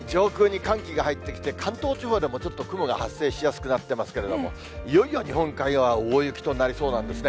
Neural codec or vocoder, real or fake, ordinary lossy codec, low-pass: none; real; none; none